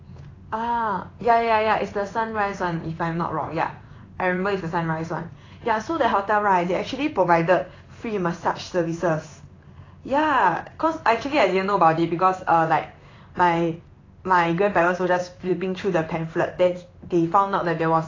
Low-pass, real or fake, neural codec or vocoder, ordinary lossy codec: 7.2 kHz; fake; codec, 16 kHz in and 24 kHz out, 1 kbps, XY-Tokenizer; AAC, 32 kbps